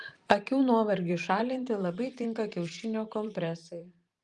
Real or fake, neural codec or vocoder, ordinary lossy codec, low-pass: fake; vocoder, 44.1 kHz, 128 mel bands every 512 samples, BigVGAN v2; Opus, 32 kbps; 10.8 kHz